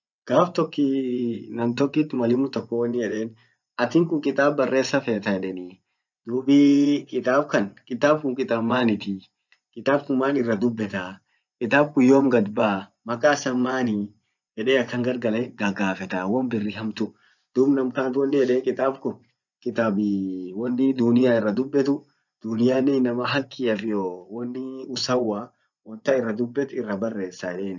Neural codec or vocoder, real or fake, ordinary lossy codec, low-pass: vocoder, 24 kHz, 100 mel bands, Vocos; fake; none; 7.2 kHz